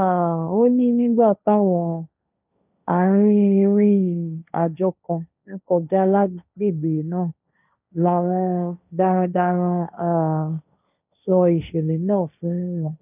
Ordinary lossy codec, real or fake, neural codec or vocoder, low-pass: none; fake; codec, 16 kHz, 1.1 kbps, Voila-Tokenizer; 3.6 kHz